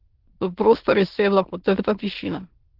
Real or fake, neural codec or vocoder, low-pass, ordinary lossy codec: fake; autoencoder, 22.05 kHz, a latent of 192 numbers a frame, VITS, trained on many speakers; 5.4 kHz; Opus, 16 kbps